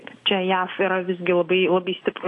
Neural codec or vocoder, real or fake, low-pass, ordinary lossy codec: none; real; 10.8 kHz; AAC, 48 kbps